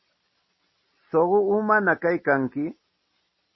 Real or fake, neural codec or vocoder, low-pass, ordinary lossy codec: real; none; 7.2 kHz; MP3, 24 kbps